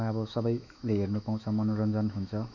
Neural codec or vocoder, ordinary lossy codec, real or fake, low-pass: autoencoder, 48 kHz, 128 numbers a frame, DAC-VAE, trained on Japanese speech; none; fake; 7.2 kHz